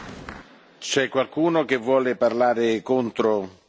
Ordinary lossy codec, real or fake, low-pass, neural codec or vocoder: none; real; none; none